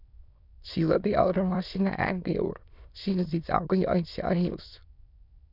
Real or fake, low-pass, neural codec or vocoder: fake; 5.4 kHz; autoencoder, 22.05 kHz, a latent of 192 numbers a frame, VITS, trained on many speakers